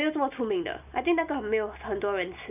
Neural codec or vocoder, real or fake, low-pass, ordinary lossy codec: none; real; 3.6 kHz; none